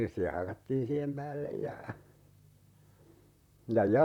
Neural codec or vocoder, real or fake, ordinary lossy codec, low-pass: vocoder, 44.1 kHz, 128 mel bands, Pupu-Vocoder; fake; none; 19.8 kHz